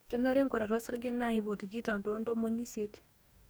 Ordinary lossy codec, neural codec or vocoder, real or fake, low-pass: none; codec, 44.1 kHz, 2.6 kbps, DAC; fake; none